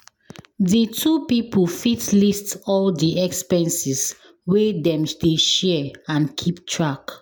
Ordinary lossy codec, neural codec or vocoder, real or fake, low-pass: none; none; real; none